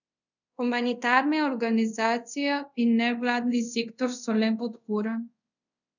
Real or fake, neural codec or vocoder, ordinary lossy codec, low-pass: fake; codec, 24 kHz, 0.5 kbps, DualCodec; none; 7.2 kHz